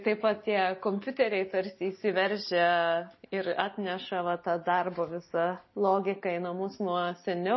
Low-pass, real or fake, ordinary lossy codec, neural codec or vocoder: 7.2 kHz; real; MP3, 24 kbps; none